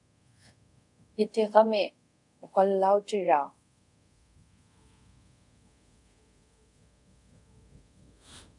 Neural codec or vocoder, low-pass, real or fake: codec, 24 kHz, 0.5 kbps, DualCodec; 10.8 kHz; fake